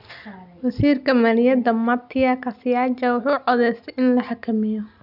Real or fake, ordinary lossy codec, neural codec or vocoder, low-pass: real; none; none; 5.4 kHz